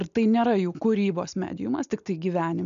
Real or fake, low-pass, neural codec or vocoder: real; 7.2 kHz; none